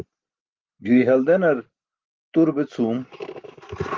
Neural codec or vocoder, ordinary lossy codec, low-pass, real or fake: none; Opus, 32 kbps; 7.2 kHz; real